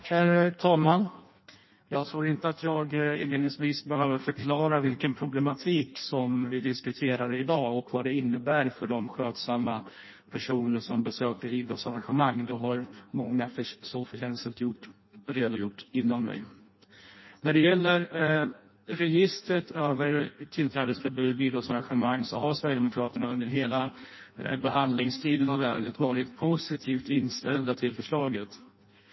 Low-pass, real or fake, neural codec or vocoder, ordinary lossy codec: 7.2 kHz; fake; codec, 16 kHz in and 24 kHz out, 0.6 kbps, FireRedTTS-2 codec; MP3, 24 kbps